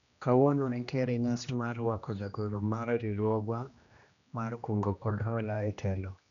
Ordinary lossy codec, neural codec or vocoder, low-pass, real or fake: none; codec, 16 kHz, 1 kbps, X-Codec, HuBERT features, trained on general audio; 7.2 kHz; fake